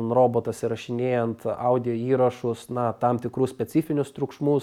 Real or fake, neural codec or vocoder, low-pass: real; none; 19.8 kHz